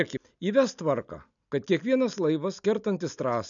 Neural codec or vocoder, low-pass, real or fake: none; 7.2 kHz; real